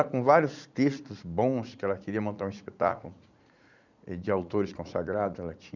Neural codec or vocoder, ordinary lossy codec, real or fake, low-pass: vocoder, 44.1 kHz, 80 mel bands, Vocos; none; fake; 7.2 kHz